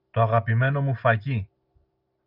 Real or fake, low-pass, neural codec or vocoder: real; 5.4 kHz; none